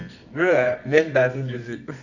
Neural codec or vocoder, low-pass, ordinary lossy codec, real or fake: codec, 24 kHz, 0.9 kbps, WavTokenizer, medium music audio release; 7.2 kHz; none; fake